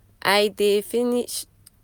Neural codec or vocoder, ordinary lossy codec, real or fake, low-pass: none; none; real; none